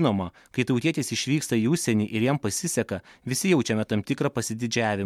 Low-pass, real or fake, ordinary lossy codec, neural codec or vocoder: 19.8 kHz; real; MP3, 96 kbps; none